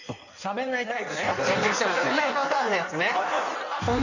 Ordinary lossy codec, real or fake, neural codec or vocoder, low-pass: none; fake; codec, 16 kHz in and 24 kHz out, 1.1 kbps, FireRedTTS-2 codec; 7.2 kHz